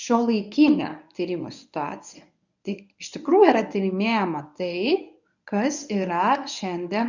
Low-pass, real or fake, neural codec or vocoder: 7.2 kHz; fake; codec, 24 kHz, 0.9 kbps, WavTokenizer, medium speech release version 1